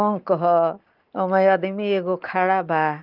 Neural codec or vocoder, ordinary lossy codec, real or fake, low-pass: none; Opus, 32 kbps; real; 5.4 kHz